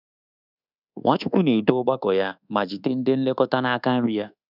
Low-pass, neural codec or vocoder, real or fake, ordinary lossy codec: 5.4 kHz; codec, 24 kHz, 1.2 kbps, DualCodec; fake; none